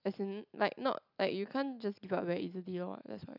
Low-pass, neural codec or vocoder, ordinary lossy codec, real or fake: 5.4 kHz; none; none; real